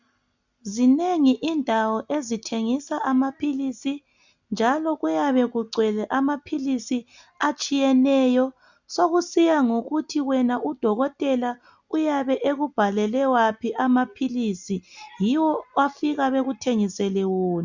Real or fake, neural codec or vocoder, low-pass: real; none; 7.2 kHz